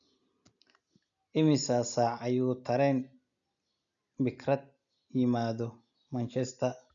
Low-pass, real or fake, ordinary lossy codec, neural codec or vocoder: 7.2 kHz; real; none; none